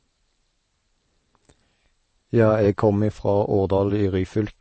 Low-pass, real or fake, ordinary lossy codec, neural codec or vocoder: 10.8 kHz; fake; MP3, 32 kbps; vocoder, 44.1 kHz, 128 mel bands every 512 samples, BigVGAN v2